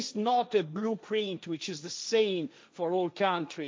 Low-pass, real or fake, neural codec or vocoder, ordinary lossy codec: none; fake; codec, 16 kHz, 1.1 kbps, Voila-Tokenizer; none